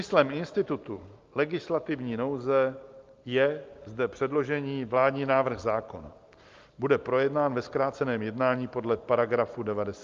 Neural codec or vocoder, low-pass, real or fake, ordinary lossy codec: none; 7.2 kHz; real; Opus, 24 kbps